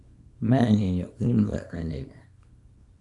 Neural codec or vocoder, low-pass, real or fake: codec, 24 kHz, 0.9 kbps, WavTokenizer, small release; 10.8 kHz; fake